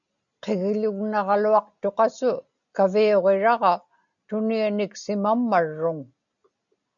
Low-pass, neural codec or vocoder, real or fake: 7.2 kHz; none; real